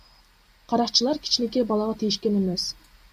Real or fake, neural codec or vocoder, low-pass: real; none; 14.4 kHz